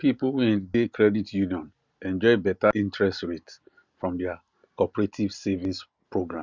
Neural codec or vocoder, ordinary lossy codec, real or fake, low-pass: none; Opus, 64 kbps; real; 7.2 kHz